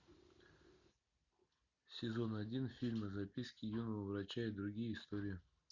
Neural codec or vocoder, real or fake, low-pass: none; real; 7.2 kHz